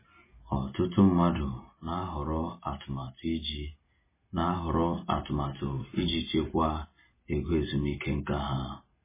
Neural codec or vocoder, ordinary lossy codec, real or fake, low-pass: none; MP3, 16 kbps; real; 3.6 kHz